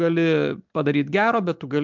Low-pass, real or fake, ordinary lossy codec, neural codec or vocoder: 7.2 kHz; real; MP3, 64 kbps; none